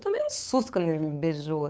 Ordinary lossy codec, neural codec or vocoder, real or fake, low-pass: none; codec, 16 kHz, 8 kbps, FunCodec, trained on LibriTTS, 25 frames a second; fake; none